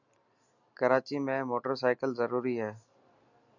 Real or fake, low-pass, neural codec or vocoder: real; 7.2 kHz; none